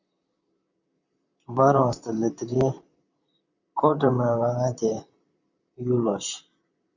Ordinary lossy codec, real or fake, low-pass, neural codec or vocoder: Opus, 64 kbps; fake; 7.2 kHz; vocoder, 44.1 kHz, 128 mel bands, Pupu-Vocoder